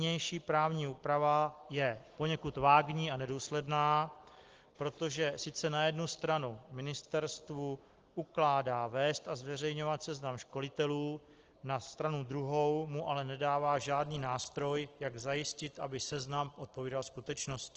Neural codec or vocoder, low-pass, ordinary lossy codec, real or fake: none; 7.2 kHz; Opus, 16 kbps; real